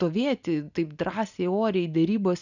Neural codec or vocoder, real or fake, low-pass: none; real; 7.2 kHz